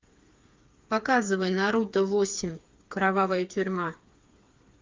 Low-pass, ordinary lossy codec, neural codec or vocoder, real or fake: 7.2 kHz; Opus, 32 kbps; codec, 16 kHz, 4 kbps, FreqCodec, smaller model; fake